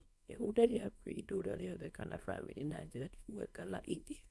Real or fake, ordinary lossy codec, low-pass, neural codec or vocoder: fake; none; none; codec, 24 kHz, 0.9 kbps, WavTokenizer, small release